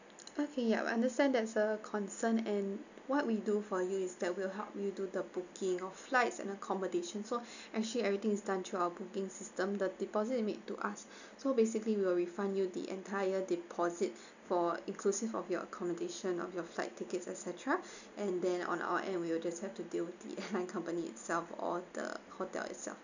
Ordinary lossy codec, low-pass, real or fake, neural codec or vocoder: none; 7.2 kHz; real; none